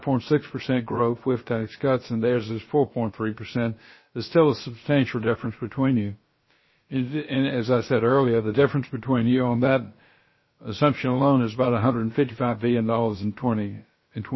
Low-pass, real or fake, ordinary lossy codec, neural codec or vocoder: 7.2 kHz; fake; MP3, 24 kbps; codec, 16 kHz, about 1 kbps, DyCAST, with the encoder's durations